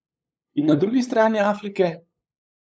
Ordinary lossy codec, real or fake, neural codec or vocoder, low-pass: none; fake; codec, 16 kHz, 8 kbps, FunCodec, trained on LibriTTS, 25 frames a second; none